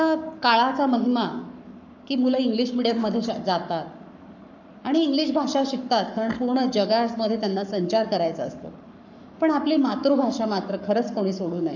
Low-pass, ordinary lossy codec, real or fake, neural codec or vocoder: 7.2 kHz; none; fake; codec, 44.1 kHz, 7.8 kbps, Pupu-Codec